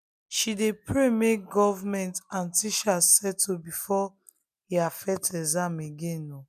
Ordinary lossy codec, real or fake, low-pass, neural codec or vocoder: none; real; 14.4 kHz; none